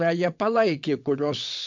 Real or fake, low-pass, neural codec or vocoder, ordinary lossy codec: fake; 7.2 kHz; vocoder, 22.05 kHz, 80 mel bands, WaveNeXt; MP3, 64 kbps